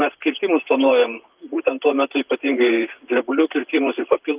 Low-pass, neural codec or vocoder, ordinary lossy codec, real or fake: 3.6 kHz; vocoder, 44.1 kHz, 128 mel bands, Pupu-Vocoder; Opus, 24 kbps; fake